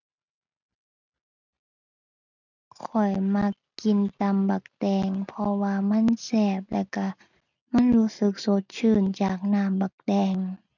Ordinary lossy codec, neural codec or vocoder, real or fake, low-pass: none; none; real; 7.2 kHz